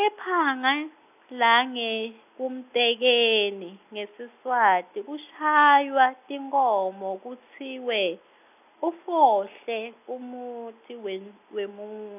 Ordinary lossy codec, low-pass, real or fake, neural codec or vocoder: none; 3.6 kHz; real; none